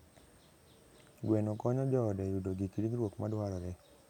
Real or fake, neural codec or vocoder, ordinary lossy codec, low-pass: real; none; none; 19.8 kHz